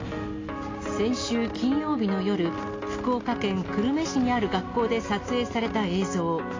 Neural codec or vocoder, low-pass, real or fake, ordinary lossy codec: none; 7.2 kHz; real; AAC, 32 kbps